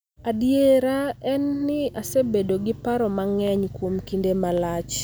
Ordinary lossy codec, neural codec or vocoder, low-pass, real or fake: none; none; none; real